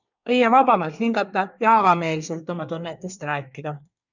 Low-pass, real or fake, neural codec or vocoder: 7.2 kHz; fake; codec, 24 kHz, 1 kbps, SNAC